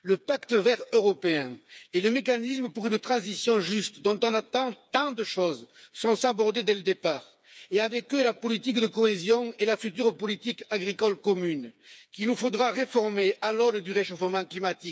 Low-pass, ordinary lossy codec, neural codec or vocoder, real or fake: none; none; codec, 16 kHz, 4 kbps, FreqCodec, smaller model; fake